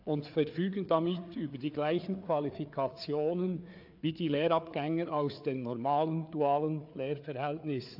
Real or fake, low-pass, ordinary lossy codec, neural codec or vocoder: fake; 5.4 kHz; AAC, 48 kbps; codec, 16 kHz, 4 kbps, FreqCodec, larger model